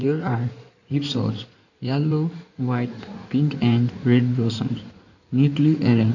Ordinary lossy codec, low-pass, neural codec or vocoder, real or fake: none; 7.2 kHz; codec, 16 kHz in and 24 kHz out, 2.2 kbps, FireRedTTS-2 codec; fake